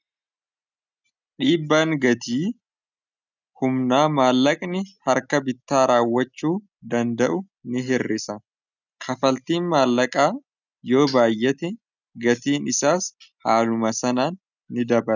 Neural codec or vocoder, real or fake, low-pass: none; real; 7.2 kHz